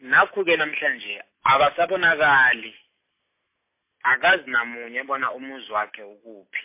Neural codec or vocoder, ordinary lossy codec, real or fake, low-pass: none; MP3, 24 kbps; real; 3.6 kHz